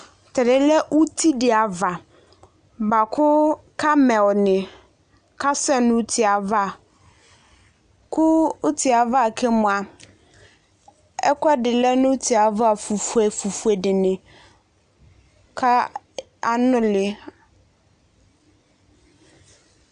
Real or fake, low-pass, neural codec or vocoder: real; 9.9 kHz; none